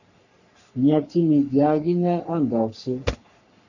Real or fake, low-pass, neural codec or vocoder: fake; 7.2 kHz; codec, 44.1 kHz, 3.4 kbps, Pupu-Codec